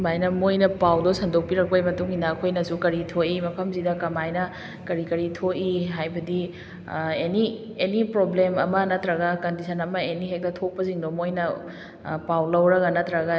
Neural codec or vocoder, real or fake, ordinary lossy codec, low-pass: none; real; none; none